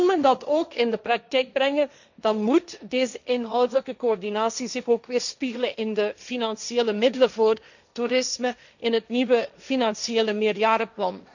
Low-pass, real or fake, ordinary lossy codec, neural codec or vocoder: 7.2 kHz; fake; none; codec, 16 kHz, 1.1 kbps, Voila-Tokenizer